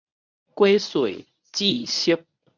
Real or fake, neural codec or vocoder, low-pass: fake; codec, 24 kHz, 0.9 kbps, WavTokenizer, medium speech release version 1; 7.2 kHz